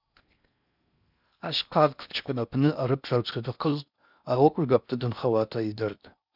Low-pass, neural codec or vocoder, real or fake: 5.4 kHz; codec, 16 kHz in and 24 kHz out, 0.6 kbps, FocalCodec, streaming, 4096 codes; fake